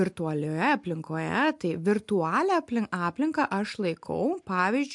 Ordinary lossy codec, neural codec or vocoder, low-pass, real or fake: MP3, 64 kbps; none; 10.8 kHz; real